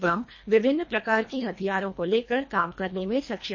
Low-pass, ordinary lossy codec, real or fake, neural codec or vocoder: 7.2 kHz; MP3, 32 kbps; fake; codec, 24 kHz, 1.5 kbps, HILCodec